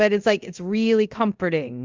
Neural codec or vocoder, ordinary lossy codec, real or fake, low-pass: codec, 24 kHz, 0.5 kbps, DualCodec; Opus, 32 kbps; fake; 7.2 kHz